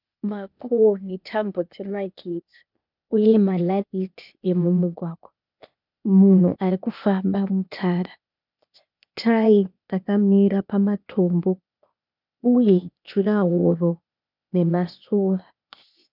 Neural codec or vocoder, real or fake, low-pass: codec, 16 kHz, 0.8 kbps, ZipCodec; fake; 5.4 kHz